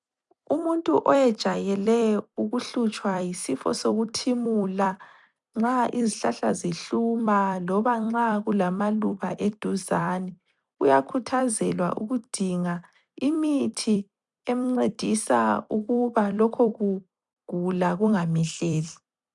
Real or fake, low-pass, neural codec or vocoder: fake; 10.8 kHz; vocoder, 44.1 kHz, 128 mel bands every 256 samples, BigVGAN v2